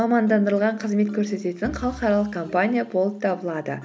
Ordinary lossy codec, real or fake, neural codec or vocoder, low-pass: none; real; none; none